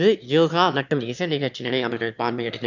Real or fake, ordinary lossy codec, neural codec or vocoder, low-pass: fake; none; autoencoder, 22.05 kHz, a latent of 192 numbers a frame, VITS, trained on one speaker; 7.2 kHz